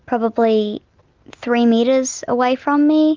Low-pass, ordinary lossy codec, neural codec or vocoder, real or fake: 7.2 kHz; Opus, 32 kbps; none; real